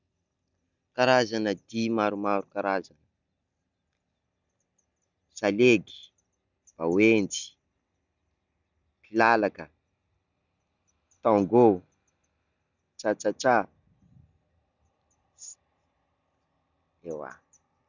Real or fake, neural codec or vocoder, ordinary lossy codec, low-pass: real; none; none; 7.2 kHz